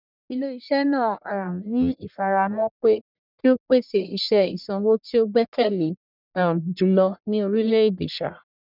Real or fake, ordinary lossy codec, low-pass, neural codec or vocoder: fake; none; 5.4 kHz; codec, 44.1 kHz, 1.7 kbps, Pupu-Codec